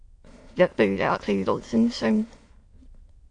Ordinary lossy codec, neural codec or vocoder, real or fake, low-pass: AAC, 48 kbps; autoencoder, 22.05 kHz, a latent of 192 numbers a frame, VITS, trained on many speakers; fake; 9.9 kHz